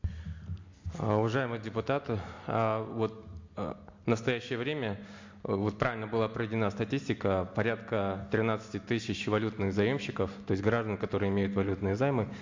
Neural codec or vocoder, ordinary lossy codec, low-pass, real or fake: none; MP3, 48 kbps; 7.2 kHz; real